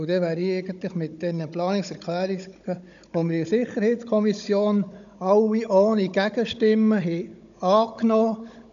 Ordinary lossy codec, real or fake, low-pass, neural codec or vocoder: none; fake; 7.2 kHz; codec, 16 kHz, 16 kbps, FunCodec, trained on Chinese and English, 50 frames a second